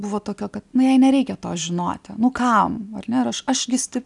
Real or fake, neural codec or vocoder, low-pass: real; none; 10.8 kHz